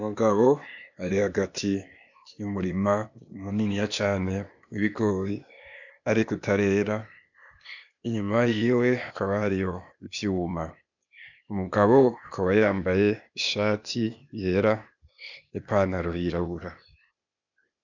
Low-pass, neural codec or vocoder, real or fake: 7.2 kHz; codec, 16 kHz, 0.8 kbps, ZipCodec; fake